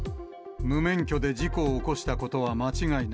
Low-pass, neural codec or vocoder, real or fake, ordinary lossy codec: none; none; real; none